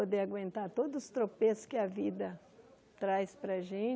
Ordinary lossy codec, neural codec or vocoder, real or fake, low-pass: none; none; real; none